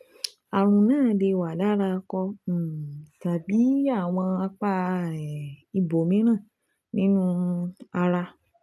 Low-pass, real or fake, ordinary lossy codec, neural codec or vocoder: none; real; none; none